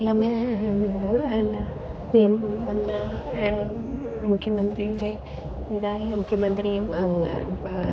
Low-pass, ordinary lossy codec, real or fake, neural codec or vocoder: none; none; fake; codec, 16 kHz, 2 kbps, X-Codec, HuBERT features, trained on general audio